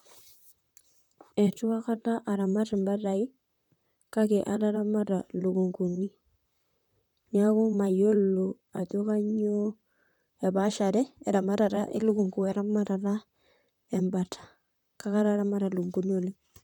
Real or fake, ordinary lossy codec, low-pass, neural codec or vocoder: fake; none; 19.8 kHz; vocoder, 44.1 kHz, 128 mel bands, Pupu-Vocoder